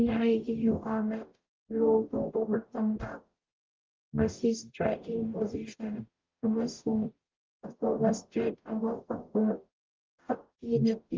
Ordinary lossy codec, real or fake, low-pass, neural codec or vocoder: Opus, 32 kbps; fake; 7.2 kHz; codec, 44.1 kHz, 0.9 kbps, DAC